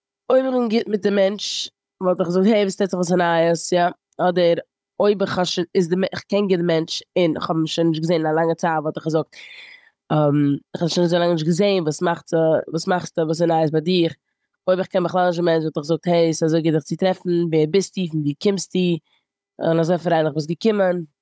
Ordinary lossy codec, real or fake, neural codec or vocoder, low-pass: none; fake; codec, 16 kHz, 16 kbps, FunCodec, trained on Chinese and English, 50 frames a second; none